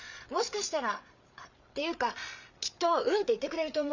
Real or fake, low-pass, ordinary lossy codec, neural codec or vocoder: fake; 7.2 kHz; none; codec, 16 kHz, 8 kbps, FreqCodec, larger model